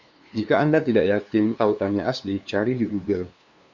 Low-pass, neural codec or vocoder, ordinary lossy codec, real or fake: 7.2 kHz; codec, 16 kHz, 2 kbps, FunCodec, trained on LibriTTS, 25 frames a second; Opus, 64 kbps; fake